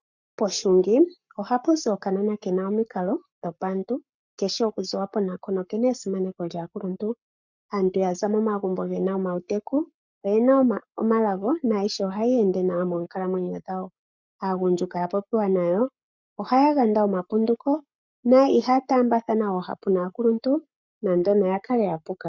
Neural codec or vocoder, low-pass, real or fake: codec, 44.1 kHz, 7.8 kbps, Pupu-Codec; 7.2 kHz; fake